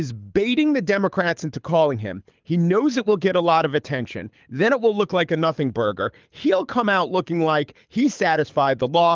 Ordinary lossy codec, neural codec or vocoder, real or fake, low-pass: Opus, 24 kbps; codec, 24 kHz, 6 kbps, HILCodec; fake; 7.2 kHz